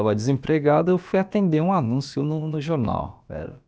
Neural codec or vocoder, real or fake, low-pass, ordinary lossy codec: codec, 16 kHz, about 1 kbps, DyCAST, with the encoder's durations; fake; none; none